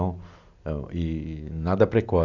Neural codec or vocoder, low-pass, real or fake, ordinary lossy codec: none; 7.2 kHz; real; none